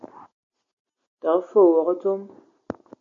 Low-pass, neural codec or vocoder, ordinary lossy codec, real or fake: 7.2 kHz; none; MP3, 48 kbps; real